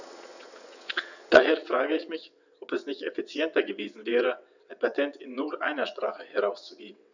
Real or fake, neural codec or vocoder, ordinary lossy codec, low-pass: fake; vocoder, 22.05 kHz, 80 mel bands, WaveNeXt; none; 7.2 kHz